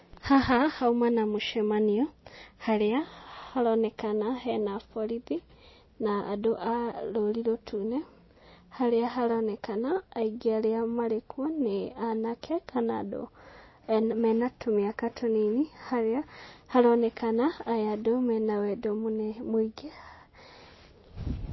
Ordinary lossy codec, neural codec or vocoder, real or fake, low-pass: MP3, 24 kbps; none; real; 7.2 kHz